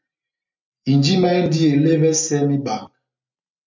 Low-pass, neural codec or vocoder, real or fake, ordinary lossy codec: 7.2 kHz; none; real; MP3, 64 kbps